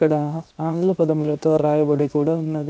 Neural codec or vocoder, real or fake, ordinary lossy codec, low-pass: codec, 16 kHz, 0.7 kbps, FocalCodec; fake; none; none